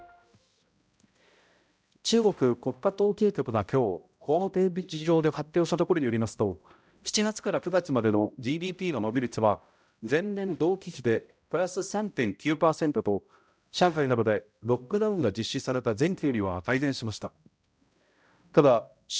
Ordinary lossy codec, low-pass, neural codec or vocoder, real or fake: none; none; codec, 16 kHz, 0.5 kbps, X-Codec, HuBERT features, trained on balanced general audio; fake